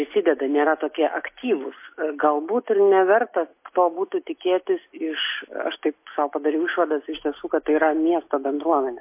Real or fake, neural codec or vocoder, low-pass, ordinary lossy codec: real; none; 3.6 kHz; MP3, 24 kbps